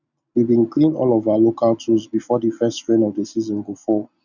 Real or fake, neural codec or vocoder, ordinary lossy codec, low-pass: real; none; none; 7.2 kHz